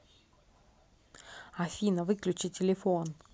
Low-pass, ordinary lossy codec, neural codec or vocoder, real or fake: none; none; none; real